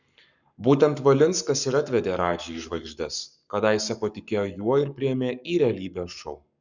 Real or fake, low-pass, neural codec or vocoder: fake; 7.2 kHz; codec, 44.1 kHz, 7.8 kbps, Pupu-Codec